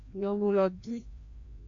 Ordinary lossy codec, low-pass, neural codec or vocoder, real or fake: MP3, 48 kbps; 7.2 kHz; codec, 16 kHz, 1 kbps, FreqCodec, larger model; fake